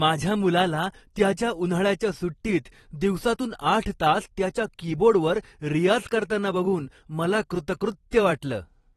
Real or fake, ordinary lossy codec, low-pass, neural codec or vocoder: fake; AAC, 32 kbps; 19.8 kHz; vocoder, 44.1 kHz, 128 mel bands every 256 samples, BigVGAN v2